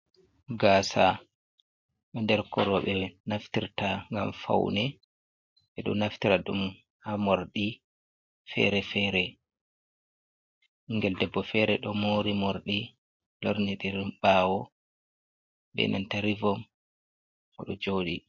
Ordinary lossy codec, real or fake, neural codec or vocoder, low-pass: MP3, 48 kbps; real; none; 7.2 kHz